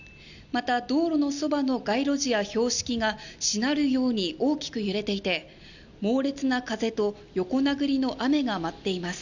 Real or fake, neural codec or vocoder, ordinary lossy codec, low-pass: real; none; none; 7.2 kHz